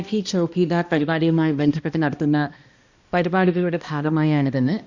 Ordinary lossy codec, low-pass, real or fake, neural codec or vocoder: Opus, 64 kbps; 7.2 kHz; fake; codec, 16 kHz, 1 kbps, X-Codec, HuBERT features, trained on balanced general audio